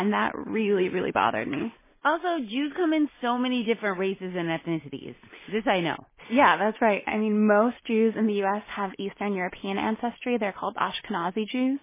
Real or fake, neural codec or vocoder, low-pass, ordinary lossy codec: real; none; 3.6 kHz; MP3, 16 kbps